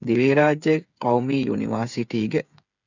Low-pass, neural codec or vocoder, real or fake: 7.2 kHz; codec, 16 kHz, 8 kbps, FreqCodec, smaller model; fake